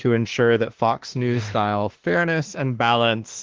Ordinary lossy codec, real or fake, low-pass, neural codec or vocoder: Opus, 16 kbps; fake; 7.2 kHz; autoencoder, 48 kHz, 32 numbers a frame, DAC-VAE, trained on Japanese speech